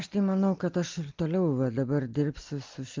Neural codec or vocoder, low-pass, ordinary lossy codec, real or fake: none; 7.2 kHz; Opus, 24 kbps; real